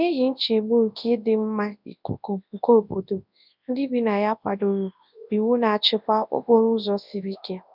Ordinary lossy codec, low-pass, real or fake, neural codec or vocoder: none; 5.4 kHz; fake; codec, 24 kHz, 0.9 kbps, WavTokenizer, large speech release